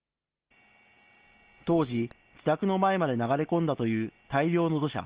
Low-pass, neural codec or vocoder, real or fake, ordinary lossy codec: 3.6 kHz; none; real; Opus, 16 kbps